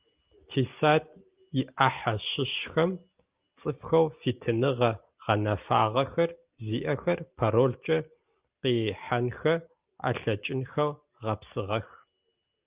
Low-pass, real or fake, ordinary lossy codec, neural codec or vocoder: 3.6 kHz; real; Opus, 24 kbps; none